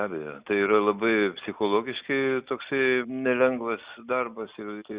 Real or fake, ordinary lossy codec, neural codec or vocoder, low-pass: real; Opus, 64 kbps; none; 3.6 kHz